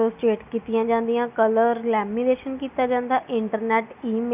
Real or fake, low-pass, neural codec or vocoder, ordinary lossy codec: real; 3.6 kHz; none; none